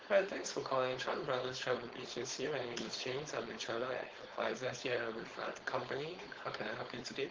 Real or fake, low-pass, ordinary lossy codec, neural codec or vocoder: fake; 7.2 kHz; Opus, 16 kbps; codec, 16 kHz, 4.8 kbps, FACodec